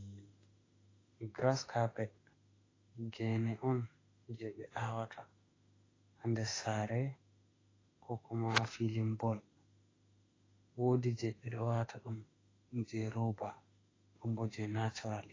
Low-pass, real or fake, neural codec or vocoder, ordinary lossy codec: 7.2 kHz; fake; autoencoder, 48 kHz, 32 numbers a frame, DAC-VAE, trained on Japanese speech; AAC, 32 kbps